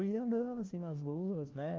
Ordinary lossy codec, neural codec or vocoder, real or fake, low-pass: Opus, 16 kbps; codec, 16 kHz, 1 kbps, FunCodec, trained on LibriTTS, 50 frames a second; fake; 7.2 kHz